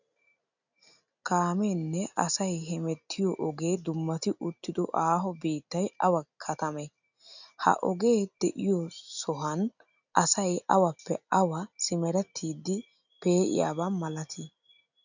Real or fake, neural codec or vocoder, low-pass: real; none; 7.2 kHz